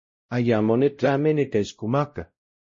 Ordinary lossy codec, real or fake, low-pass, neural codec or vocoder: MP3, 32 kbps; fake; 7.2 kHz; codec, 16 kHz, 0.5 kbps, X-Codec, WavLM features, trained on Multilingual LibriSpeech